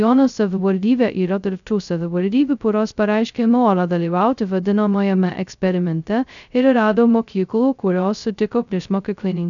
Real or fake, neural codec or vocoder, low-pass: fake; codec, 16 kHz, 0.2 kbps, FocalCodec; 7.2 kHz